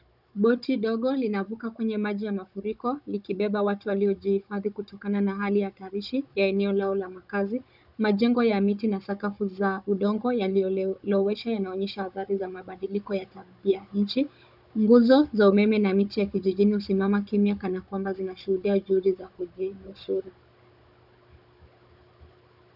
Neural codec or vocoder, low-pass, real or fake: codec, 16 kHz, 16 kbps, FunCodec, trained on Chinese and English, 50 frames a second; 5.4 kHz; fake